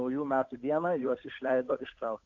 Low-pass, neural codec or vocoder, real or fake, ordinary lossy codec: 7.2 kHz; codec, 16 kHz, 8 kbps, FunCodec, trained on Chinese and English, 25 frames a second; fake; AAC, 48 kbps